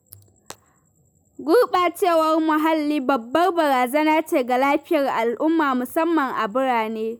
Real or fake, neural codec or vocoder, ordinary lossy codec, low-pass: real; none; none; none